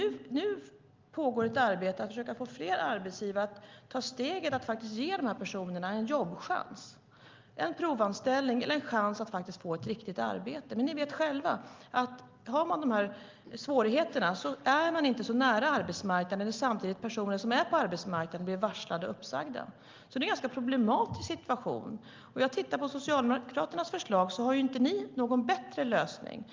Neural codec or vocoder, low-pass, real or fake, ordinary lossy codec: none; 7.2 kHz; real; Opus, 32 kbps